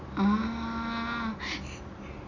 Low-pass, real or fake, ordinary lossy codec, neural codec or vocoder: 7.2 kHz; real; none; none